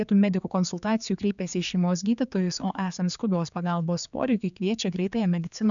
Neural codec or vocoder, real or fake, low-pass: codec, 16 kHz, 4 kbps, X-Codec, HuBERT features, trained on general audio; fake; 7.2 kHz